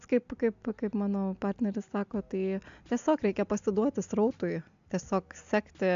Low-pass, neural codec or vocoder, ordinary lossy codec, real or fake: 7.2 kHz; none; AAC, 64 kbps; real